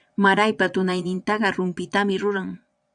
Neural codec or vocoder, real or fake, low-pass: vocoder, 22.05 kHz, 80 mel bands, Vocos; fake; 9.9 kHz